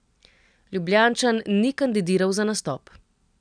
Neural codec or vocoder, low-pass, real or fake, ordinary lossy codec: none; 9.9 kHz; real; none